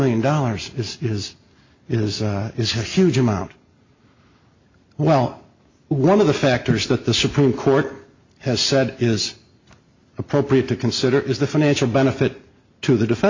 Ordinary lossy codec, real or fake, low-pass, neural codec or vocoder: MP3, 48 kbps; real; 7.2 kHz; none